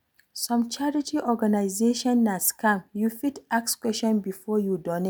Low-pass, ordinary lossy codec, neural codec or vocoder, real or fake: none; none; none; real